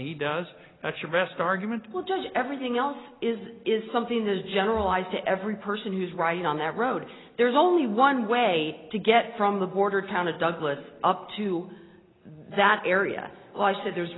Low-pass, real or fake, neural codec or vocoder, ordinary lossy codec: 7.2 kHz; real; none; AAC, 16 kbps